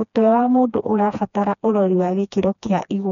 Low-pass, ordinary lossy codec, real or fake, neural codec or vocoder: 7.2 kHz; none; fake; codec, 16 kHz, 2 kbps, FreqCodec, smaller model